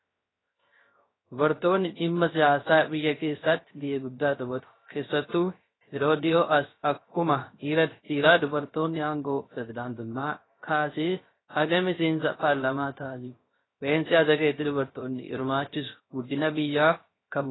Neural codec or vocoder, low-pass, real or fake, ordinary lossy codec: codec, 16 kHz, 0.3 kbps, FocalCodec; 7.2 kHz; fake; AAC, 16 kbps